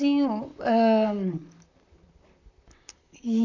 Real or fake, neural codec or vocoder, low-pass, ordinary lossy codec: fake; vocoder, 44.1 kHz, 128 mel bands, Pupu-Vocoder; 7.2 kHz; none